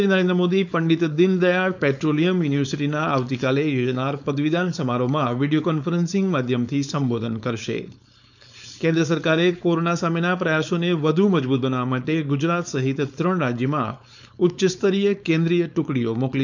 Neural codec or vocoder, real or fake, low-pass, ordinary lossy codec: codec, 16 kHz, 4.8 kbps, FACodec; fake; 7.2 kHz; none